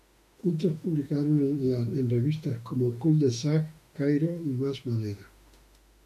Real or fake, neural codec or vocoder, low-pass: fake; autoencoder, 48 kHz, 32 numbers a frame, DAC-VAE, trained on Japanese speech; 14.4 kHz